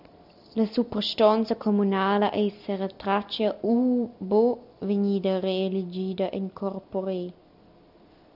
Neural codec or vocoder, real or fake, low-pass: none; real; 5.4 kHz